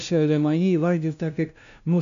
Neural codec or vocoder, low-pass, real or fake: codec, 16 kHz, 0.5 kbps, FunCodec, trained on LibriTTS, 25 frames a second; 7.2 kHz; fake